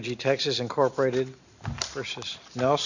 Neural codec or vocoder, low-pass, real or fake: none; 7.2 kHz; real